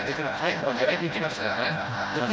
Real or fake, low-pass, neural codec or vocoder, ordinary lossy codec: fake; none; codec, 16 kHz, 0.5 kbps, FreqCodec, smaller model; none